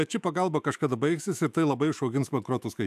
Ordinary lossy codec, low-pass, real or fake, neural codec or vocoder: AAC, 96 kbps; 14.4 kHz; fake; autoencoder, 48 kHz, 128 numbers a frame, DAC-VAE, trained on Japanese speech